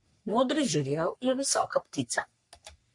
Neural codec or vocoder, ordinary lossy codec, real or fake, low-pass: codec, 44.1 kHz, 3.4 kbps, Pupu-Codec; MP3, 64 kbps; fake; 10.8 kHz